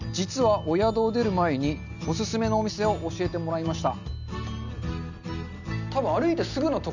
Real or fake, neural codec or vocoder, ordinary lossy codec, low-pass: real; none; none; 7.2 kHz